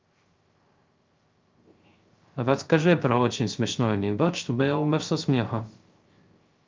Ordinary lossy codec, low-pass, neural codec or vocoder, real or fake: Opus, 24 kbps; 7.2 kHz; codec, 16 kHz, 0.3 kbps, FocalCodec; fake